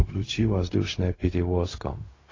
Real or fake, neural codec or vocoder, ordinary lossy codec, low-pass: fake; codec, 16 kHz, 0.4 kbps, LongCat-Audio-Codec; AAC, 32 kbps; 7.2 kHz